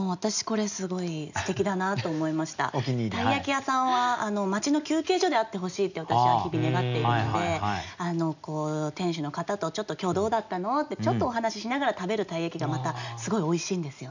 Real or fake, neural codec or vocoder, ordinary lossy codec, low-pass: real; none; none; 7.2 kHz